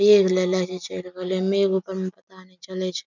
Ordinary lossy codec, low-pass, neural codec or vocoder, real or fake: none; 7.2 kHz; none; real